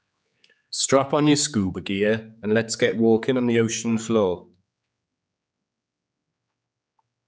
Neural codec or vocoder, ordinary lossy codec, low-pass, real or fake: codec, 16 kHz, 4 kbps, X-Codec, HuBERT features, trained on general audio; none; none; fake